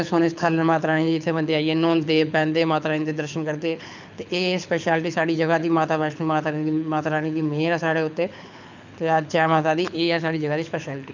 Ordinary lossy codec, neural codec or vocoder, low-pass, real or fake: none; codec, 24 kHz, 6 kbps, HILCodec; 7.2 kHz; fake